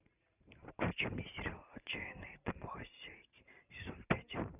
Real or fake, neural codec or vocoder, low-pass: fake; vocoder, 44.1 kHz, 128 mel bands every 512 samples, BigVGAN v2; 3.6 kHz